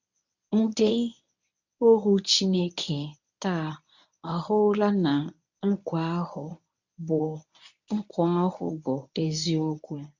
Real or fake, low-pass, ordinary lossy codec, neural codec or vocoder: fake; 7.2 kHz; none; codec, 24 kHz, 0.9 kbps, WavTokenizer, medium speech release version 1